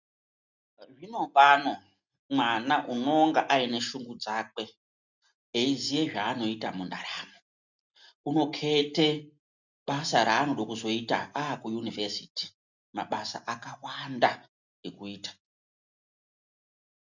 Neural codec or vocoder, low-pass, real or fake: none; 7.2 kHz; real